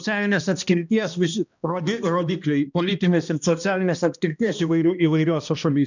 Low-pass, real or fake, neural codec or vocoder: 7.2 kHz; fake; codec, 16 kHz, 1 kbps, X-Codec, HuBERT features, trained on balanced general audio